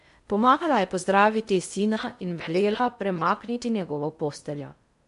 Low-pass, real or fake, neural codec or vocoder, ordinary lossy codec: 10.8 kHz; fake; codec, 16 kHz in and 24 kHz out, 0.6 kbps, FocalCodec, streaming, 4096 codes; MP3, 64 kbps